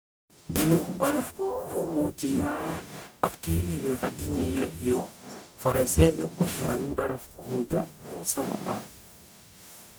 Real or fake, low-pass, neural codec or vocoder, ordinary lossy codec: fake; none; codec, 44.1 kHz, 0.9 kbps, DAC; none